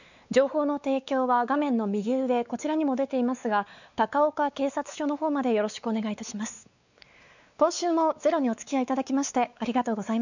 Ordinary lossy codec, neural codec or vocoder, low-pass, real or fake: none; codec, 16 kHz, 4 kbps, X-Codec, WavLM features, trained on Multilingual LibriSpeech; 7.2 kHz; fake